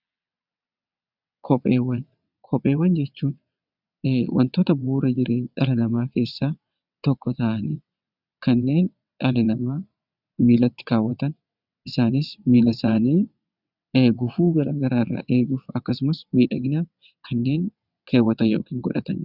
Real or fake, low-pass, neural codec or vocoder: fake; 5.4 kHz; vocoder, 22.05 kHz, 80 mel bands, WaveNeXt